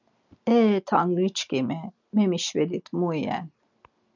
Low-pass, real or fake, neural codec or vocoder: 7.2 kHz; real; none